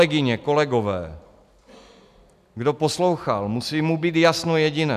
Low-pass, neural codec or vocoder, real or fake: 14.4 kHz; none; real